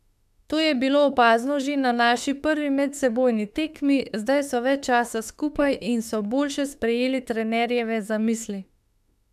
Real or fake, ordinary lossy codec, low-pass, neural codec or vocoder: fake; none; 14.4 kHz; autoencoder, 48 kHz, 32 numbers a frame, DAC-VAE, trained on Japanese speech